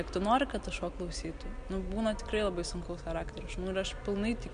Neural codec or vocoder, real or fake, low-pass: none; real; 9.9 kHz